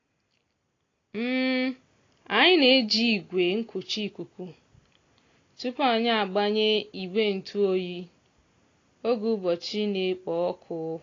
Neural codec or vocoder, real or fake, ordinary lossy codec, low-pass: none; real; AAC, 32 kbps; 7.2 kHz